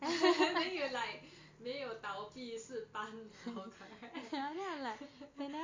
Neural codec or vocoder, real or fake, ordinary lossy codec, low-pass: none; real; MP3, 48 kbps; 7.2 kHz